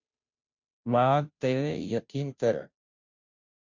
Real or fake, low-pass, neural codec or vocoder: fake; 7.2 kHz; codec, 16 kHz, 0.5 kbps, FunCodec, trained on Chinese and English, 25 frames a second